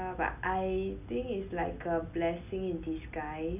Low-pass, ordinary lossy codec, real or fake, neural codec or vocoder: 3.6 kHz; none; real; none